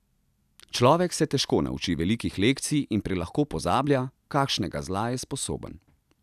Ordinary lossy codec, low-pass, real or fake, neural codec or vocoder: none; 14.4 kHz; real; none